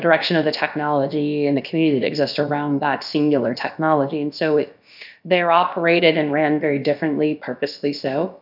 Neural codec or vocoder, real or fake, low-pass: codec, 16 kHz, about 1 kbps, DyCAST, with the encoder's durations; fake; 5.4 kHz